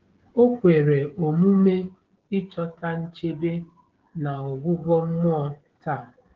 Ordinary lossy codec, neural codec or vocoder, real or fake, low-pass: Opus, 16 kbps; codec, 16 kHz, 16 kbps, FreqCodec, smaller model; fake; 7.2 kHz